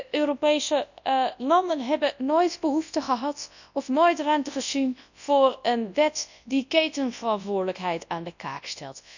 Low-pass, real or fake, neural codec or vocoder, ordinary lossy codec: 7.2 kHz; fake; codec, 24 kHz, 0.9 kbps, WavTokenizer, large speech release; none